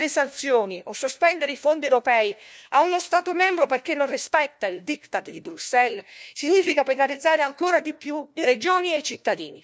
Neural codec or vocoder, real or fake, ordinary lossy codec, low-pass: codec, 16 kHz, 1 kbps, FunCodec, trained on LibriTTS, 50 frames a second; fake; none; none